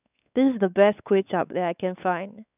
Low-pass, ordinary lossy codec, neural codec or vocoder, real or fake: 3.6 kHz; none; codec, 16 kHz, 4 kbps, X-Codec, WavLM features, trained on Multilingual LibriSpeech; fake